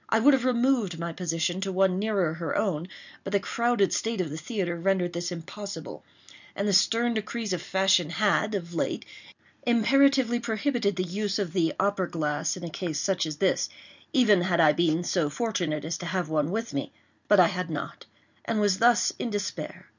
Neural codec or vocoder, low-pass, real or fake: none; 7.2 kHz; real